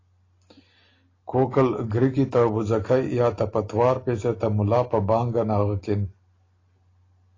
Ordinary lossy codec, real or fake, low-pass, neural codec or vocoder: AAC, 32 kbps; real; 7.2 kHz; none